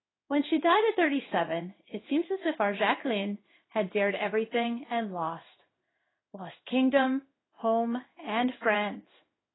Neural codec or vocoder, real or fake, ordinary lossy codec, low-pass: codec, 16 kHz in and 24 kHz out, 1 kbps, XY-Tokenizer; fake; AAC, 16 kbps; 7.2 kHz